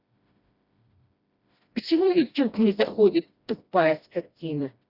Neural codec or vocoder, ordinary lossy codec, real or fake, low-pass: codec, 16 kHz, 1 kbps, FreqCodec, smaller model; Opus, 64 kbps; fake; 5.4 kHz